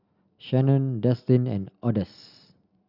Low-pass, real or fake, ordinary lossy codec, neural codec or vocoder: 5.4 kHz; real; Opus, 64 kbps; none